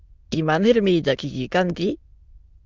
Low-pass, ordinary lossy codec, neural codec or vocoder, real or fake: 7.2 kHz; Opus, 24 kbps; autoencoder, 22.05 kHz, a latent of 192 numbers a frame, VITS, trained on many speakers; fake